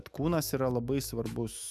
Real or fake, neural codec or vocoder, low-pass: fake; autoencoder, 48 kHz, 128 numbers a frame, DAC-VAE, trained on Japanese speech; 14.4 kHz